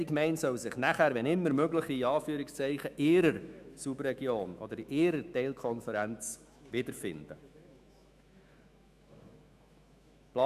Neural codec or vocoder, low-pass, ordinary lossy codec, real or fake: autoencoder, 48 kHz, 128 numbers a frame, DAC-VAE, trained on Japanese speech; 14.4 kHz; none; fake